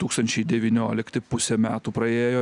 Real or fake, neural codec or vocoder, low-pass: real; none; 10.8 kHz